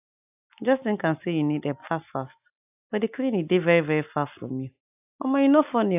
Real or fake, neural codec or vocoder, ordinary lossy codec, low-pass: real; none; AAC, 32 kbps; 3.6 kHz